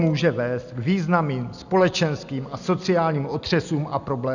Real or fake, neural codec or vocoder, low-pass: real; none; 7.2 kHz